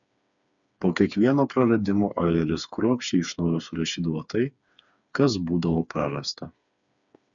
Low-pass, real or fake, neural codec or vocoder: 7.2 kHz; fake; codec, 16 kHz, 4 kbps, FreqCodec, smaller model